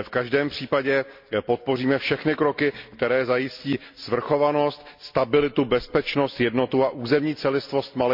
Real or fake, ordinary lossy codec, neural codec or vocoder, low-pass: real; MP3, 48 kbps; none; 5.4 kHz